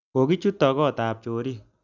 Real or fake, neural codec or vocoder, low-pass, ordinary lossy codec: real; none; 7.2 kHz; Opus, 64 kbps